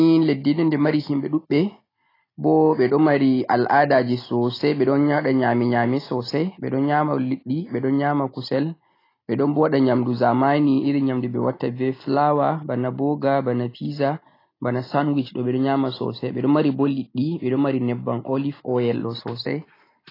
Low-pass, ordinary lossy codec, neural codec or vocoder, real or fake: 5.4 kHz; AAC, 24 kbps; none; real